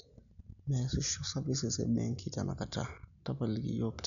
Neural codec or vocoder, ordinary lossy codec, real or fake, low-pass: none; none; real; 7.2 kHz